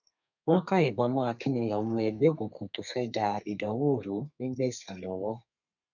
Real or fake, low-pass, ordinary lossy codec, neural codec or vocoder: fake; 7.2 kHz; none; codec, 32 kHz, 1.9 kbps, SNAC